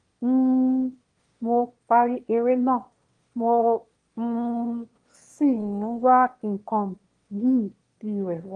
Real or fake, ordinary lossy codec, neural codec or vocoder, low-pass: fake; Opus, 24 kbps; autoencoder, 22.05 kHz, a latent of 192 numbers a frame, VITS, trained on one speaker; 9.9 kHz